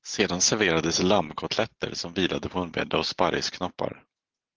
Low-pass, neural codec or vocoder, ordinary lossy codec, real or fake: 7.2 kHz; none; Opus, 16 kbps; real